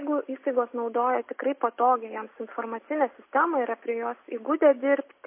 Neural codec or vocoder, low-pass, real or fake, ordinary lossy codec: none; 3.6 kHz; real; MP3, 24 kbps